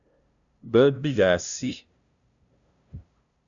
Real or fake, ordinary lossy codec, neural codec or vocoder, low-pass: fake; MP3, 96 kbps; codec, 16 kHz, 0.5 kbps, FunCodec, trained on LibriTTS, 25 frames a second; 7.2 kHz